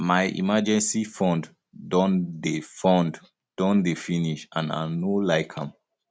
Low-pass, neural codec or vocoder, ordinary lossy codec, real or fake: none; none; none; real